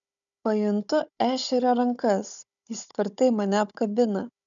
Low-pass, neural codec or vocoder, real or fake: 7.2 kHz; codec, 16 kHz, 16 kbps, FunCodec, trained on Chinese and English, 50 frames a second; fake